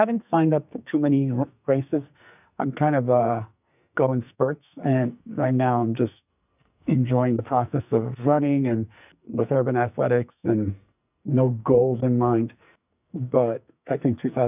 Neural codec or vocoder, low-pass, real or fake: codec, 44.1 kHz, 2.6 kbps, SNAC; 3.6 kHz; fake